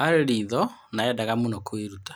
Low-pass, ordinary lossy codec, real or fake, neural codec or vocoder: none; none; real; none